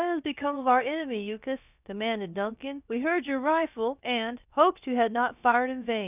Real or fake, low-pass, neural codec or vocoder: fake; 3.6 kHz; codec, 16 kHz, about 1 kbps, DyCAST, with the encoder's durations